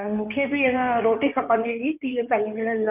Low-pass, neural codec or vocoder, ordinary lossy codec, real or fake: 3.6 kHz; codec, 16 kHz in and 24 kHz out, 2.2 kbps, FireRedTTS-2 codec; none; fake